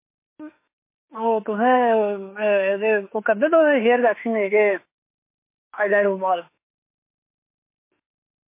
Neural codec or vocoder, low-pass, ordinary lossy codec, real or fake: autoencoder, 48 kHz, 32 numbers a frame, DAC-VAE, trained on Japanese speech; 3.6 kHz; MP3, 24 kbps; fake